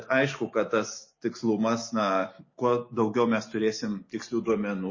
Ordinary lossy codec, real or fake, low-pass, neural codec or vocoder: MP3, 32 kbps; real; 7.2 kHz; none